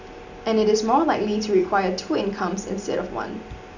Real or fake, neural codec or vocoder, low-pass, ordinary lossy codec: real; none; 7.2 kHz; none